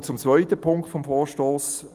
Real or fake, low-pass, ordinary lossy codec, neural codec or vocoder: real; 14.4 kHz; Opus, 32 kbps; none